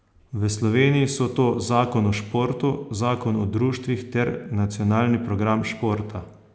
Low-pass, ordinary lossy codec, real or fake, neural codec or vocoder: none; none; real; none